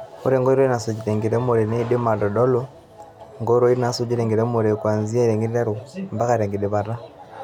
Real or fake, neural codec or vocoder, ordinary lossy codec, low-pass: real; none; none; 19.8 kHz